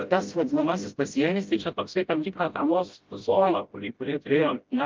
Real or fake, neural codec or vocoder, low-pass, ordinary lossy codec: fake; codec, 16 kHz, 0.5 kbps, FreqCodec, smaller model; 7.2 kHz; Opus, 24 kbps